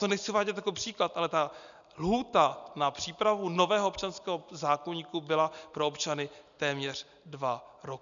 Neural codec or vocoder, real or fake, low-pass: none; real; 7.2 kHz